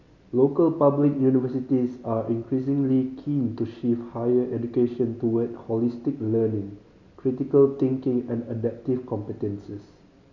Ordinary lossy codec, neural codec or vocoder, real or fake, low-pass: none; none; real; 7.2 kHz